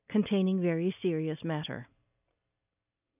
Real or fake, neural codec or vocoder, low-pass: real; none; 3.6 kHz